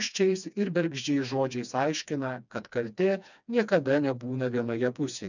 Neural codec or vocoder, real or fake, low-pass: codec, 16 kHz, 2 kbps, FreqCodec, smaller model; fake; 7.2 kHz